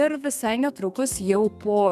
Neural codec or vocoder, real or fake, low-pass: codec, 32 kHz, 1.9 kbps, SNAC; fake; 14.4 kHz